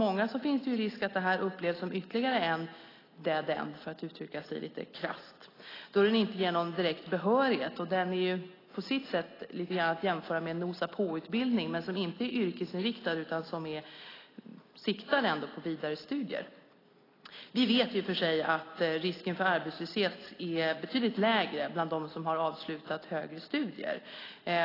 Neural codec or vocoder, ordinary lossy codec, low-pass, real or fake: none; AAC, 24 kbps; 5.4 kHz; real